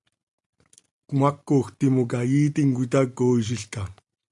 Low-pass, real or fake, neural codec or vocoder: 10.8 kHz; real; none